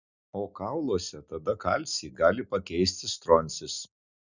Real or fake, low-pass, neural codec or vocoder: real; 7.2 kHz; none